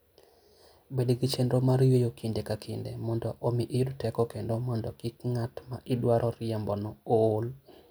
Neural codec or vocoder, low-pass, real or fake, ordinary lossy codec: none; none; real; none